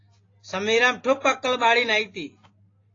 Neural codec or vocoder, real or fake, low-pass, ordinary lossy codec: none; real; 7.2 kHz; AAC, 32 kbps